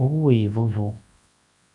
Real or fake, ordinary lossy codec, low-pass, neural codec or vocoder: fake; MP3, 96 kbps; 10.8 kHz; codec, 24 kHz, 0.9 kbps, WavTokenizer, large speech release